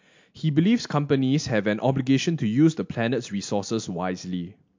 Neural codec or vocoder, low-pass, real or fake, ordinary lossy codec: none; 7.2 kHz; real; MP3, 48 kbps